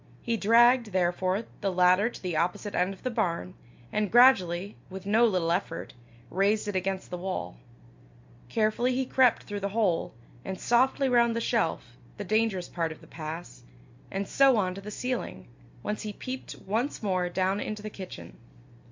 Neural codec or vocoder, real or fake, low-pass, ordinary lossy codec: none; real; 7.2 kHz; MP3, 64 kbps